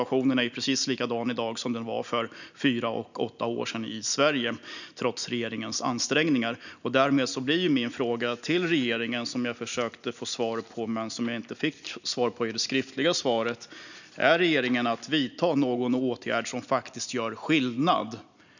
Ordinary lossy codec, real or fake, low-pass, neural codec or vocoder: none; real; 7.2 kHz; none